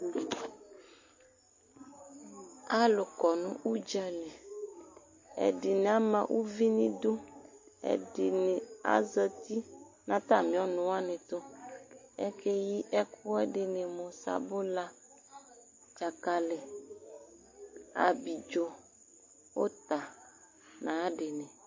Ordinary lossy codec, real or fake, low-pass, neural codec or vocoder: MP3, 32 kbps; real; 7.2 kHz; none